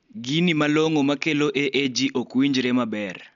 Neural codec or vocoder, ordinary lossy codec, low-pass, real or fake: none; MP3, 64 kbps; 7.2 kHz; real